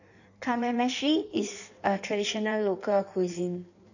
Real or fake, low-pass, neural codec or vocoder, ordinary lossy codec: fake; 7.2 kHz; codec, 16 kHz in and 24 kHz out, 1.1 kbps, FireRedTTS-2 codec; none